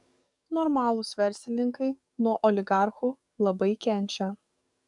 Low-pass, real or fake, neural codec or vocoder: 10.8 kHz; fake; codec, 44.1 kHz, 7.8 kbps, DAC